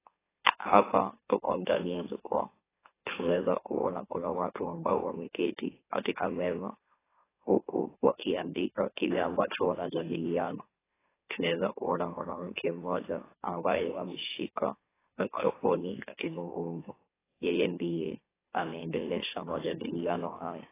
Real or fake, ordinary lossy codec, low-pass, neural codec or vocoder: fake; AAC, 16 kbps; 3.6 kHz; autoencoder, 44.1 kHz, a latent of 192 numbers a frame, MeloTTS